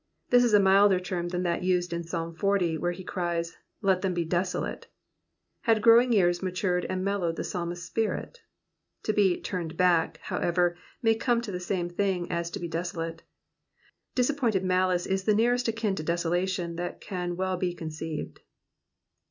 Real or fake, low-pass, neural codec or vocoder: real; 7.2 kHz; none